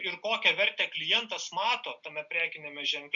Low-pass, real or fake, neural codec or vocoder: 7.2 kHz; real; none